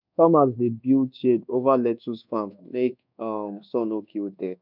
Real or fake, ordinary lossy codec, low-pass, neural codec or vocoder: fake; none; 5.4 kHz; codec, 24 kHz, 1.2 kbps, DualCodec